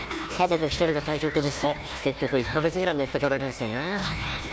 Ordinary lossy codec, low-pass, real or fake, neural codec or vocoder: none; none; fake; codec, 16 kHz, 1 kbps, FunCodec, trained on Chinese and English, 50 frames a second